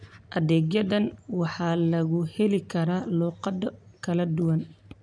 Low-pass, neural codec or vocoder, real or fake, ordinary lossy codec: 9.9 kHz; none; real; none